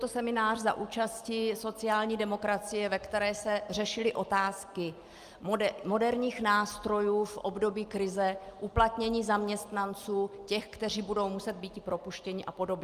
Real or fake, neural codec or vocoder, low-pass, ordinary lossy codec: real; none; 14.4 kHz; Opus, 32 kbps